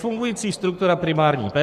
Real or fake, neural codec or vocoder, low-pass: fake; codec, 44.1 kHz, 7.8 kbps, Pupu-Codec; 14.4 kHz